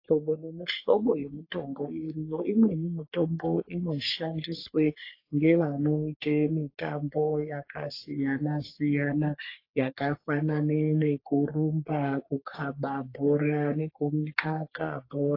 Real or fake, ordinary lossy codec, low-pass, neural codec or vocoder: fake; AAC, 32 kbps; 5.4 kHz; codec, 44.1 kHz, 3.4 kbps, Pupu-Codec